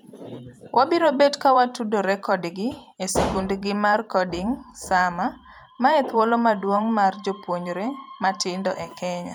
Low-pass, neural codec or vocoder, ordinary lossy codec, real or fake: none; vocoder, 44.1 kHz, 128 mel bands every 512 samples, BigVGAN v2; none; fake